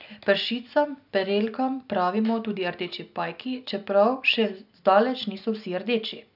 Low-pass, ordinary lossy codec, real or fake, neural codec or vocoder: 5.4 kHz; none; real; none